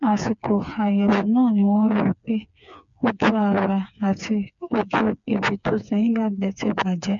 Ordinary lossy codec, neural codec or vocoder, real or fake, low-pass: MP3, 96 kbps; codec, 16 kHz, 4 kbps, FreqCodec, smaller model; fake; 7.2 kHz